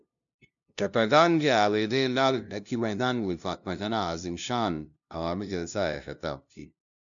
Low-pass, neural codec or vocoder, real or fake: 7.2 kHz; codec, 16 kHz, 0.5 kbps, FunCodec, trained on LibriTTS, 25 frames a second; fake